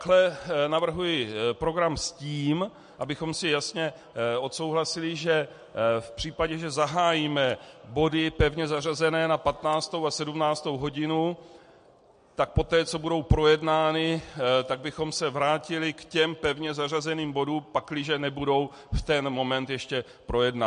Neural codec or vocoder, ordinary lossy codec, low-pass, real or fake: none; MP3, 48 kbps; 9.9 kHz; real